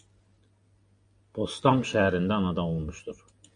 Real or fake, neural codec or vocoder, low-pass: real; none; 9.9 kHz